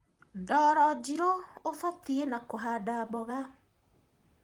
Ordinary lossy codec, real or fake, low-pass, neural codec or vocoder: Opus, 32 kbps; fake; 19.8 kHz; vocoder, 44.1 kHz, 128 mel bands, Pupu-Vocoder